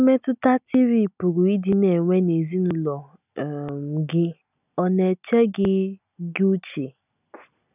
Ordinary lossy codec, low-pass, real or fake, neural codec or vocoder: none; 3.6 kHz; real; none